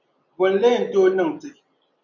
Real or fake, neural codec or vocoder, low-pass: real; none; 7.2 kHz